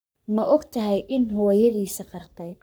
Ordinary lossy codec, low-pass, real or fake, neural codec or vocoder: none; none; fake; codec, 44.1 kHz, 3.4 kbps, Pupu-Codec